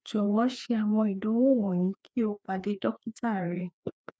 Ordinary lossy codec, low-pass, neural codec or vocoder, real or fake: none; none; codec, 16 kHz, 2 kbps, FreqCodec, larger model; fake